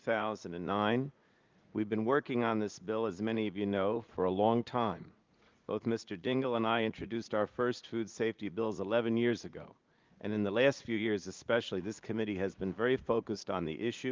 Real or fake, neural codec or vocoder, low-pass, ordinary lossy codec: fake; vocoder, 44.1 kHz, 80 mel bands, Vocos; 7.2 kHz; Opus, 24 kbps